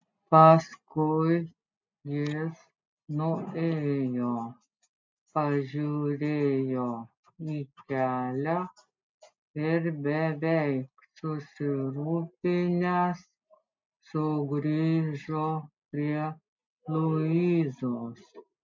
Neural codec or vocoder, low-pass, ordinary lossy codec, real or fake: none; 7.2 kHz; MP3, 64 kbps; real